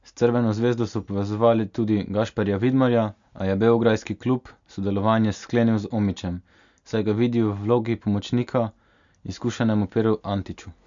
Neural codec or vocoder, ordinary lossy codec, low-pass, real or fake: none; MP3, 64 kbps; 7.2 kHz; real